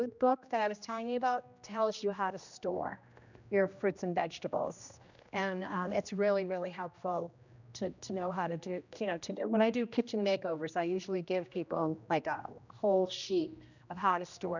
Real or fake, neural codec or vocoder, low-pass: fake; codec, 16 kHz, 1 kbps, X-Codec, HuBERT features, trained on general audio; 7.2 kHz